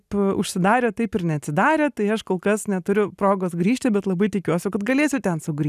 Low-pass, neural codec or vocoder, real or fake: 14.4 kHz; none; real